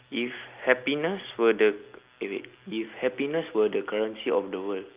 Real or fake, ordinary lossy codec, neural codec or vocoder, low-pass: real; Opus, 24 kbps; none; 3.6 kHz